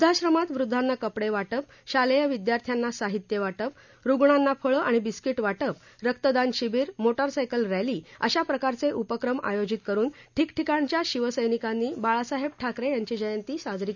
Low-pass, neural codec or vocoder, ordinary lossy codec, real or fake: 7.2 kHz; none; none; real